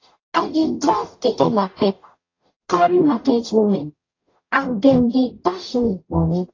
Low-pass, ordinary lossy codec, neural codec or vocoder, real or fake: 7.2 kHz; AAC, 32 kbps; codec, 44.1 kHz, 0.9 kbps, DAC; fake